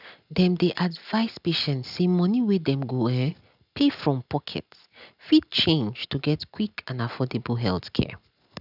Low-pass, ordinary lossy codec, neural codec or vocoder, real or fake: 5.4 kHz; none; none; real